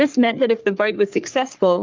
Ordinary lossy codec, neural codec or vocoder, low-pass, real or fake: Opus, 24 kbps; codec, 44.1 kHz, 3.4 kbps, Pupu-Codec; 7.2 kHz; fake